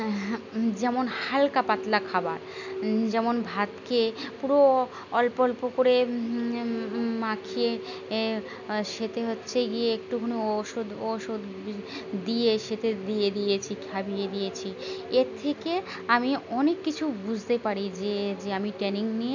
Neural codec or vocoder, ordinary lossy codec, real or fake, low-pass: none; none; real; 7.2 kHz